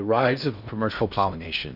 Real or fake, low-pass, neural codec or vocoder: fake; 5.4 kHz; codec, 16 kHz in and 24 kHz out, 0.6 kbps, FocalCodec, streaming, 2048 codes